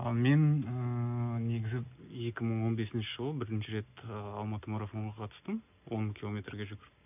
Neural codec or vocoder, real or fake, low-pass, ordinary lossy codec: none; real; 3.6 kHz; none